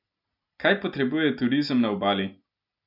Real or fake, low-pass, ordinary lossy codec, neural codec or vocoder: real; 5.4 kHz; none; none